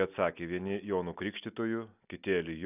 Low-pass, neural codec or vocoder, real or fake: 3.6 kHz; none; real